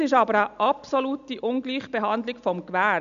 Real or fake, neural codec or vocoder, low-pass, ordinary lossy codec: real; none; 7.2 kHz; none